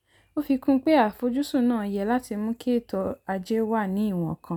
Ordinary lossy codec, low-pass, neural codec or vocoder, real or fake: none; 19.8 kHz; none; real